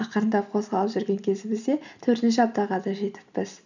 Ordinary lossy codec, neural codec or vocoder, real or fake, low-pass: none; none; real; 7.2 kHz